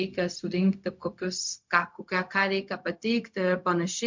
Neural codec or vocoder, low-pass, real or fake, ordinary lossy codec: codec, 16 kHz, 0.4 kbps, LongCat-Audio-Codec; 7.2 kHz; fake; MP3, 48 kbps